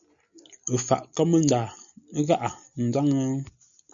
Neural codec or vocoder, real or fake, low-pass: none; real; 7.2 kHz